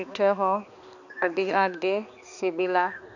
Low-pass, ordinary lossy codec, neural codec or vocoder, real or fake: 7.2 kHz; none; codec, 16 kHz, 2 kbps, X-Codec, HuBERT features, trained on balanced general audio; fake